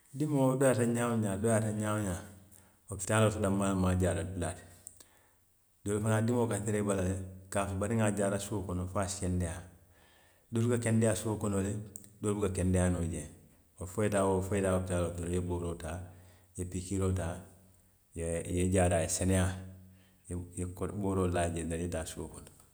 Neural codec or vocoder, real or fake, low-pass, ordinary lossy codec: none; real; none; none